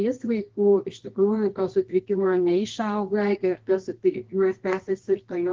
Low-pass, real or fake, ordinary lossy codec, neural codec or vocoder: 7.2 kHz; fake; Opus, 16 kbps; codec, 24 kHz, 0.9 kbps, WavTokenizer, medium music audio release